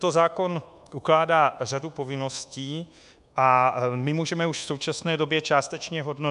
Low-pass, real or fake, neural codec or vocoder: 10.8 kHz; fake; codec, 24 kHz, 1.2 kbps, DualCodec